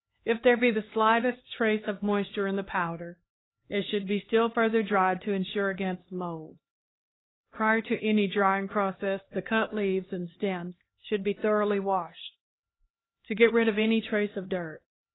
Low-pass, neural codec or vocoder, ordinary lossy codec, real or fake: 7.2 kHz; codec, 16 kHz, 2 kbps, X-Codec, HuBERT features, trained on LibriSpeech; AAC, 16 kbps; fake